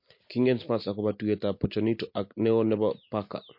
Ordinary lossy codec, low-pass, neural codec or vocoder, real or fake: MP3, 32 kbps; 5.4 kHz; none; real